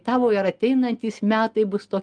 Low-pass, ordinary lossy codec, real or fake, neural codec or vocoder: 9.9 kHz; Opus, 32 kbps; real; none